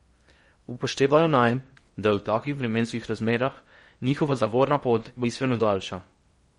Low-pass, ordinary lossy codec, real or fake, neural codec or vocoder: 10.8 kHz; MP3, 48 kbps; fake; codec, 16 kHz in and 24 kHz out, 0.8 kbps, FocalCodec, streaming, 65536 codes